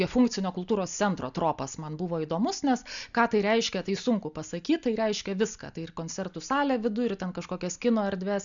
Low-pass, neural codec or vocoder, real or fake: 7.2 kHz; none; real